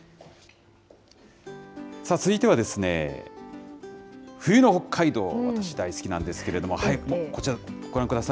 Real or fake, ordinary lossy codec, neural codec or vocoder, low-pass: real; none; none; none